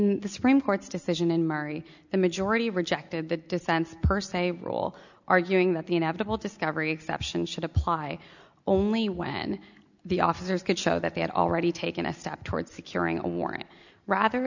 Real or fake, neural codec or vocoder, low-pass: real; none; 7.2 kHz